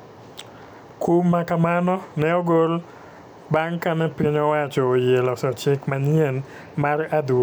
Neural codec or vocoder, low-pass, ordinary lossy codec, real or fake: none; none; none; real